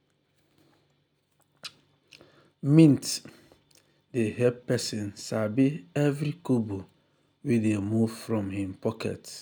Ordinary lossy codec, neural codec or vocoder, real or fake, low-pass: none; none; real; none